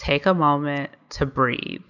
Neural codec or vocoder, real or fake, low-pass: none; real; 7.2 kHz